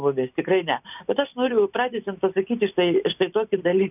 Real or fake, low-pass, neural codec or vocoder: real; 3.6 kHz; none